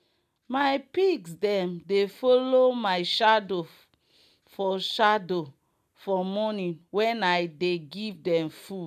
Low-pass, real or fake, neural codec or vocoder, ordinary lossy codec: 14.4 kHz; real; none; none